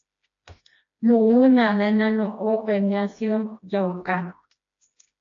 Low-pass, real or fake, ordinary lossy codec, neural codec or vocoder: 7.2 kHz; fake; AAC, 48 kbps; codec, 16 kHz, 1 kbps, FreqCodec, smaller model